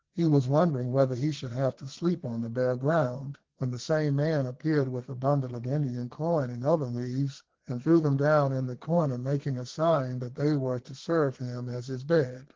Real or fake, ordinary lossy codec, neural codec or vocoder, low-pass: fake; Opus, 16 kbps; codec, 32 kHz, 1.9 kbps, SNAC; 7.2 kHz